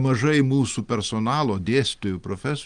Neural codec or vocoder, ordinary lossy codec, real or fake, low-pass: none; Opus, 24 kbps; real; 9.9 kHz